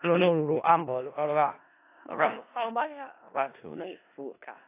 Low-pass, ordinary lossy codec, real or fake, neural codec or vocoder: 3.6 kHz; AAC, 24 kbps; fake; codec, 16 kHz in and 24 kHz out, 0.4 kbps, LongCat-Audio-Codec, four codebook decoder